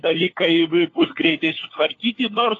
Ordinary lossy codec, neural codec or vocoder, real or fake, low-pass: AAC, 32 kbps; codec, 16 kHz, 4 kbps, FunCodec, trained on LibriTTS, 50 frames a second; fake; 7.2 kHz